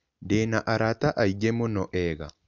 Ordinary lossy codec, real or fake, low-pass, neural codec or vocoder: none; real; 7.2 kHz; none